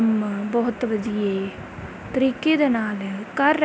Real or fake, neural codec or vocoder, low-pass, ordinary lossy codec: real; none; none; none